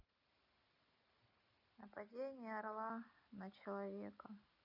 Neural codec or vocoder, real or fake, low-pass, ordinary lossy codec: none; real; 5.4 kHz; none